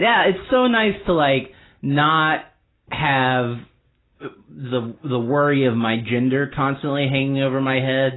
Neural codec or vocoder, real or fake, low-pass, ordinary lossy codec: none; real; 7.2 kHz; AAC, 16 kbps